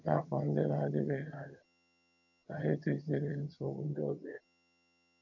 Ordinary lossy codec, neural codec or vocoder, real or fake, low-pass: none; vocoder, 22.05 kHz, 80 mel bands, HiFi-GAN; fake; 7.2 kHz